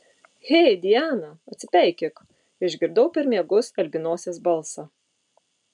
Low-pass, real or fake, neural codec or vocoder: 10.8 kHz; real; none